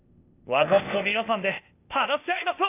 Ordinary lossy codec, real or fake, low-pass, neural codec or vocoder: AAC, 32 kbps; fake; 3.6 kHz; codec, 16 kHz, 0.8 kbps, ZipCodec